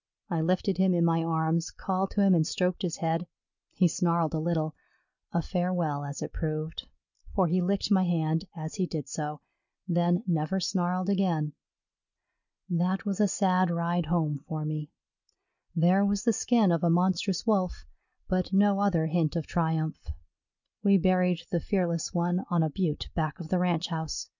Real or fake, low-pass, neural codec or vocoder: real; 7.2 kHz; none